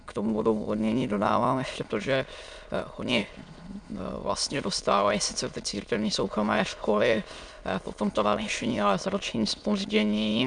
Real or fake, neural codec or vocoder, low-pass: fake; autoencoder, 22.05 kHz, a latent of 192 numbers a frame, VITS, trained on many speakers; 9.9 kHz